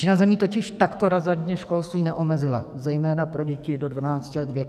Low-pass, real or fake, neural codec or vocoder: 14.4 kHz; fake; codec, 44.1 kHz, 2.6 kbps, SNAC